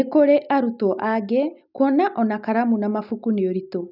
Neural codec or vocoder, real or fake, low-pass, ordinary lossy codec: none; real; 5.4 kHz; none